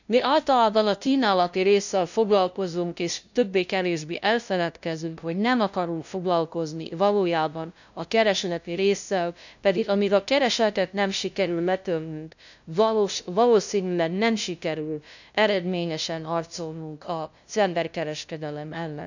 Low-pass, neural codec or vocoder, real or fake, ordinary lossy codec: 7.2 kHz; codec, 16 kHz, 0.5 kbps, FunCodec, trained on LibriTTS, 25 frames a second; fake; none